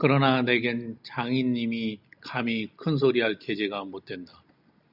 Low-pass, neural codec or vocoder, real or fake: 5.4 kHz; none; real